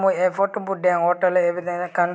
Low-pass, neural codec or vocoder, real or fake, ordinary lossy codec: none; none; real; none